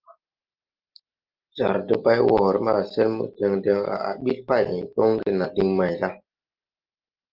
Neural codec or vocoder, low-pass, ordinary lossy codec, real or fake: none; 5.4 kHz; Opus, 32 kbps; real